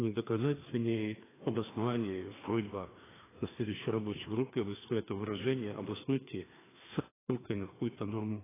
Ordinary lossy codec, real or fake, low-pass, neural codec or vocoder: AAC, 16 kbps; fake; 3.6 kHz; codec, 16 kHz, 2 kbps, FreqCodec, larger model